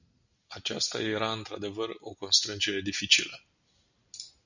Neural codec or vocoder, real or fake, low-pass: none; real; 7.2 kHz